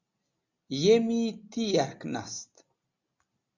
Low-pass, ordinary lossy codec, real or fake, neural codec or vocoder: 7.2 kHz; Opus, 64 kbps; real; none